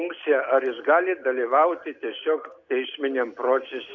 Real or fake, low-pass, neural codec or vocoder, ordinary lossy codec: real; 7.2 kHz; none; MP3, 64 kbps